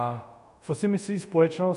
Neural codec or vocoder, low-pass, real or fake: codec, 24 kHz, 0.5 kbps, DualCodec; 10.8 kHz; fake